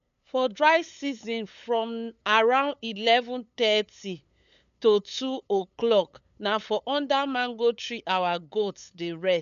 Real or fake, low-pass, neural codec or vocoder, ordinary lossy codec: fake; 7.2 kHz; codec, 16 kHz, 8 kbps, FunCodec, trained on LibriTTS, 25 frames a second; none